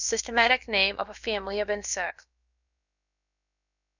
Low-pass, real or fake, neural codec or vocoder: 7.2 kHz; fake; codec, 16 kHz, about 1 kbps, DyCAST, with the encoder's durations